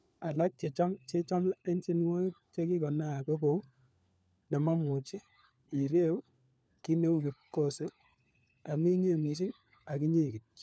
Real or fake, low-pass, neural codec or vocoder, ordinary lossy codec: fake; none; codec, 16 kHz, 4 kbps, FunCodec, trained on LibriTTS, 50 frames a second; none